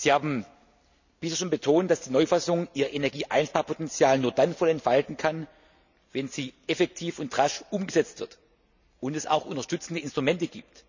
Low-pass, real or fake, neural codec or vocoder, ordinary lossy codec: 7.2 kHz; real; none; none